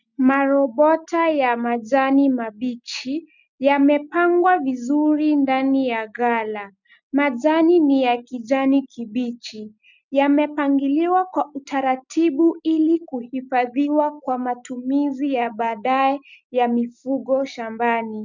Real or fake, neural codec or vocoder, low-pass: real; none; 7.2 kHz